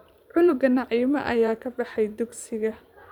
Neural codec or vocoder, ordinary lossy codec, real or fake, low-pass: vocoder, 44.1 kHz, 128 mel bands every 512 samples, BigVGAN v2; none; fake; 19.8 kHz